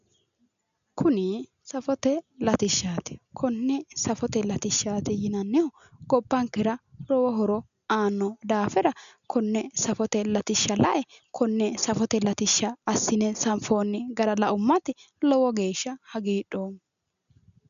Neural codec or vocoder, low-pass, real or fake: none; 7.2 kHz; real